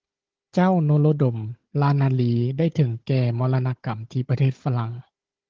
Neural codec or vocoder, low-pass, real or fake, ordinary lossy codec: codec, 16 kHz, 16 kbps, FunCodec, trained on Chinese and English, 50 frames a second; 7.2 kHz; fake; Opus, 16 kbps